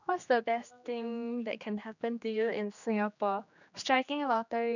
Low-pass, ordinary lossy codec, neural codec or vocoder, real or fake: 7.2 kHz; none; codec, 16 kHz, 2 kbps, X-Codec, HuBERT features, trained on general audio; fake